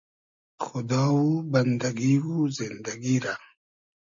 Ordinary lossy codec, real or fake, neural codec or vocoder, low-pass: MP3, 48 kbps; real; none; 7.2 kHz